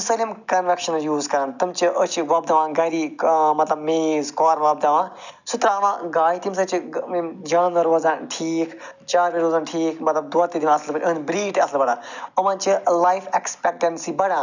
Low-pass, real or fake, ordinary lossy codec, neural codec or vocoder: 7.2 kHz; real; none; none